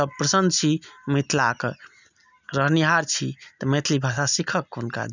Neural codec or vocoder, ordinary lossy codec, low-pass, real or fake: none; none; 7.2 kHz; real